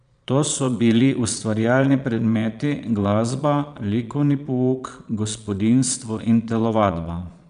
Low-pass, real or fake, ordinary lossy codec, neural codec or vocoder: 9.9 kHz; fake; none; vocoder, 22.05 kHz, 80 mel bands, Vocos